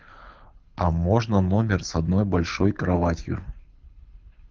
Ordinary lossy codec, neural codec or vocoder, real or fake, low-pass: Opus, 32 kbps; codec, 24 kHz, 6 kbps, HILCodec; fake; 7.2 kHz